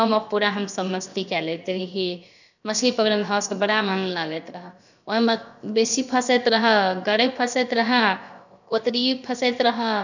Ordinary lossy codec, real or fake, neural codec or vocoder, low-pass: none; fake; codec, 16 kHz, about 1 kbps, DyCAST, with the encoder's durations; 7.2 kHz